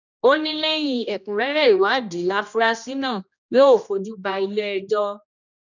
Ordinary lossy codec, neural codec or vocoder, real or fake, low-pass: none; codec, 16 kHz, 1 kbps, X-Codec, HuBERT features, trained on general audio; fake; 7.2 kHz